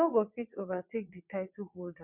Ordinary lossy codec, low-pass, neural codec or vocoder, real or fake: none; 3.6 kHz; vocoder, 22.05 kHz, 80 mel bands, WaveNeXt; fake